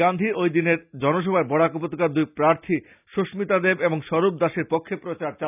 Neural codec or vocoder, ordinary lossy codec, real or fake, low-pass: none; none; real; 3.6 kHz